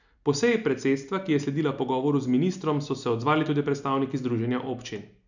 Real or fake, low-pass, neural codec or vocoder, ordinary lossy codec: real; 7.2 kHz; none; none